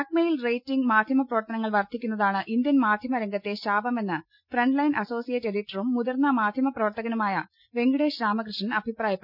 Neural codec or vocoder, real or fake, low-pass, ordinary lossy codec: none; real; 5.4 kHz; none